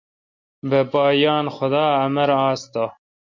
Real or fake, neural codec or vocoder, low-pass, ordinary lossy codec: real; none; 7.2 kHz; MP3, 48 kbps